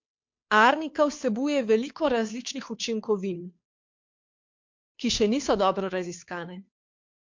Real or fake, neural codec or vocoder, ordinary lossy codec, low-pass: fake; codec, 16 kHz, 2 kbps, FunCodec, trained on Chinese and English, 25 frames a second; MP3, 48 kbps; 7.2 kHz